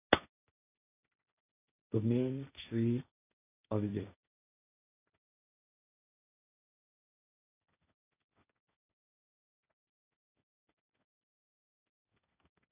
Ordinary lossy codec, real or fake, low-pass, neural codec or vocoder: none; fake; 3.6 kHz; codec, 16 kHz, 1.1 kbps, Voila-Tokenizer